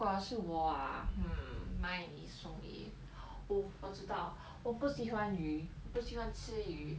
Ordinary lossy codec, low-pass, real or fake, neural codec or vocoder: none; none; real; none